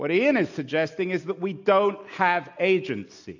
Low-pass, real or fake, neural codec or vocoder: 7.2 kHz; real; none